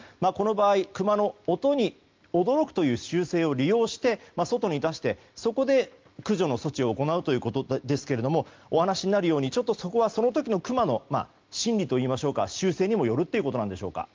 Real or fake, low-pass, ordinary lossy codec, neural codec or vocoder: real; 7.2 kHz; Opus, 32 kbps; none